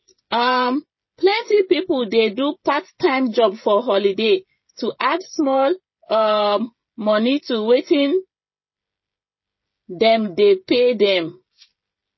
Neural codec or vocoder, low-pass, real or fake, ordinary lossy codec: codec, 16 kHz, 16 kbps, FreqCodec, smaller model; 7.2 kHz; fake; MP3, 24 kbps